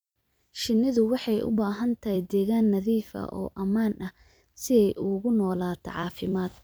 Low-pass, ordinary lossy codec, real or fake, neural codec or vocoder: none; none; fake; vocoder, 44.1 kHz, 128 mel bands every 256 samples, BigVGAN v2